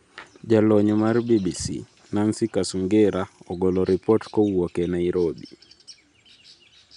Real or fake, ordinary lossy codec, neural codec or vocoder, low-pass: real; none; none; 10.8 kHz